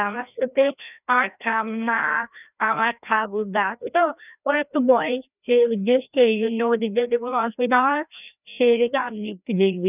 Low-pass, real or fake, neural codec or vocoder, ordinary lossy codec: 3.6 kHz; fake; codec, 16 kHz, 1 kbps, FreqCodec, larger model; none